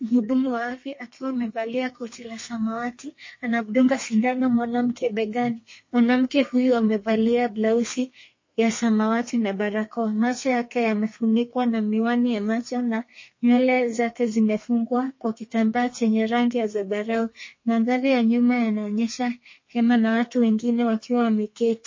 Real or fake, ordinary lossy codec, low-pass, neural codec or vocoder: fake; MP3, 32 kbps; 7.2 kHz; codec, 32 kHz, 1.9 kbps, SNAC